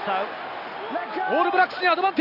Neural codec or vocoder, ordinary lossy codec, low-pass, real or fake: none; none; 5.4 kHz; real